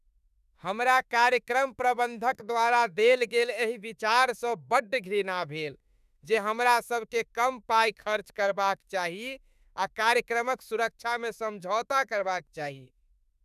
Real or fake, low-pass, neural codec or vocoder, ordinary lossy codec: fake; 14.4 kHz; autoencoder, 48 kHz, 32 numbers a frame, DAC-VAE, trained on Japanese speech; none